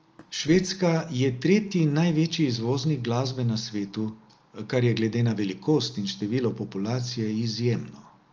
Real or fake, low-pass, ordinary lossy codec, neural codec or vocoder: real; 7.2 kHz; Opus, 24 kbps; none